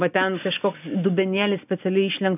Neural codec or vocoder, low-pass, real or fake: none; 3.6 kHz; real